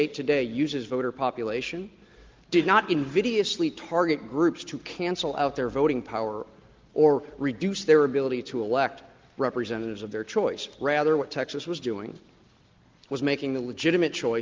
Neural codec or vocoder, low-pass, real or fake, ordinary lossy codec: none; 7.2 kHz; real; Opus, 32 kbps